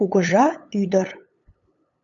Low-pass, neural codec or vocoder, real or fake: 7.2 kHz; codec, 16 kHz, 8 kbps, FunCodec, trained on LibriTTS, 25 frames a second; fake